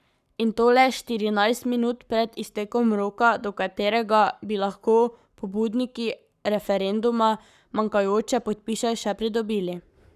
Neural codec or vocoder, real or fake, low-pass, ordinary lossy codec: codec, 44.1 kHz, 7.8 kbps, Pupu-Codec; fake; 14.4 kHz; none